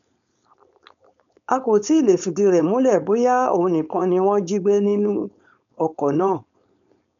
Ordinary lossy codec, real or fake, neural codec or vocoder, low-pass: none; fake; codec, 16 kHz, 4.8 kbps, FACodec; 7.2 kHz